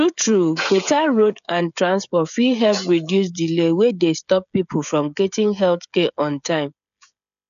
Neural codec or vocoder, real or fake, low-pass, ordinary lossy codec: codec, 16 kHz, 16 kbps, FreqCodec, smaller model; fake; 7.2 kHz; none